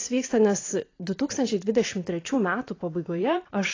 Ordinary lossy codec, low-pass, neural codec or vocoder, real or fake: AAC, 32 kbps; 7.2 kHz; vocoder, 44.1 kHz, 128 mel bands every 256 samples, BigVGAN v2; fake